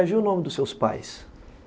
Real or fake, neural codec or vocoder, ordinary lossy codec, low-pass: real; none; none; none